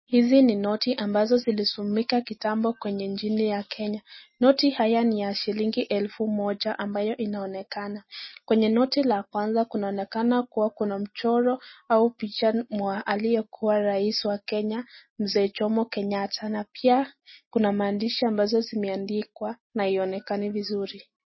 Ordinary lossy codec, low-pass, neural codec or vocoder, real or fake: MP3, 24 kbps; 7.2 kHz; none; real